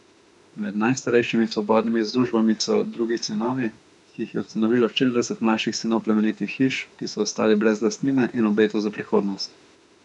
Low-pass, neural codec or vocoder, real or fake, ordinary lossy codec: 10.8 kHz; autoencoder, 48 kHz, 32 numbers a frame, DAC-VAE, trained on Japanese speech; fake; none